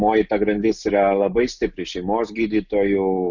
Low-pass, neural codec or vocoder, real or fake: 7.2 kHz; none; real